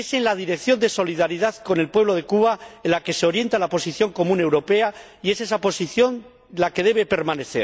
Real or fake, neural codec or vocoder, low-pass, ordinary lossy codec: real; none; none; none